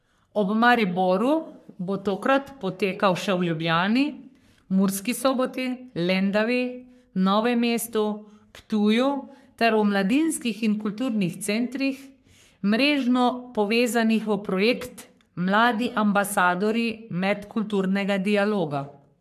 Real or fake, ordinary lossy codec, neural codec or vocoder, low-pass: fake; AAC, 96 kbps; codec, 44.1 kHz, 3.4 kbps, Pupu-Codec; 14.4 kHz